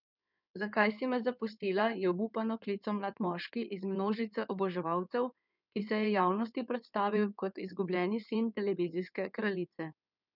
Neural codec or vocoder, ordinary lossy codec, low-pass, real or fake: codec, 16 kHz in and 24 kHz out, 2.2 kbps, FireRedTTS-2 codec; none; 5.4 kHz; fake